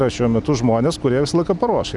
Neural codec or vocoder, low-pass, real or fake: none; 10.8 kHz; real